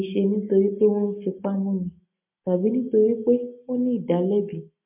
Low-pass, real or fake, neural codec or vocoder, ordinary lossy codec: 3.6 kHz; real; none; MP3, 32 kbps